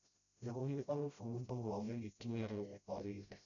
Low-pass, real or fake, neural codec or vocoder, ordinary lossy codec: 7.2 kHz; fake; codec, 16 kHz, 0.5 kbps, FreqCodec, smaller model; none